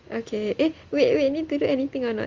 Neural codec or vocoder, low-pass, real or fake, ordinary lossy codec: none; 7.2 kHz; real; Opus, 32 kbps